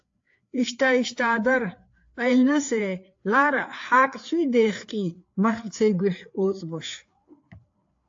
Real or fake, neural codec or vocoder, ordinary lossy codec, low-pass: fake; codec, 16 kHz, 4 kbps, FreqCodec, larger model; AAC, 48 kbps; 7.2 kHz